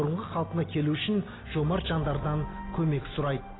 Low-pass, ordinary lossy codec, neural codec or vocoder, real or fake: 7.2 kHz; AAC, 16 kbps; none; real